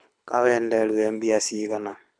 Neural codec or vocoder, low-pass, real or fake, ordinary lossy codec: codec, 24 kHz, 6 kbps, HILCodec; 9.9 kHz; fake; none